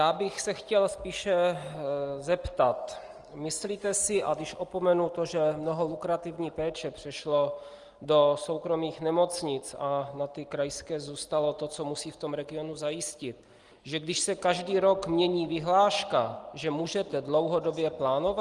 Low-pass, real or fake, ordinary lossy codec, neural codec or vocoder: 10.8 kHz; real; Opus, 24 kbps; none